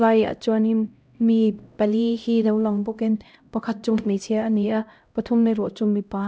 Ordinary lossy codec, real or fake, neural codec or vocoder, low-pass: none; fake; codec, 16 kHz, 0.5 kbps, X-Codec, HuBERT features, trained on LibriSpeech; none